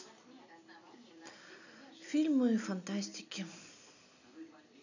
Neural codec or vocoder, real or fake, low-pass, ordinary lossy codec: none; real; 7.2 kHz; none